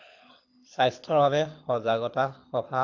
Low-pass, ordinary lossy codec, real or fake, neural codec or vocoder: 7.2 kHz; AAC, 48 kbps; fake; codec, 24 kHz, 6 kbps, HILCodec